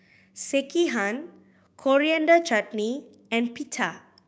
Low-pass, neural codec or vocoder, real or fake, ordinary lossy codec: none; codec, 16 kHz, 6 kbps, DAC; fake; none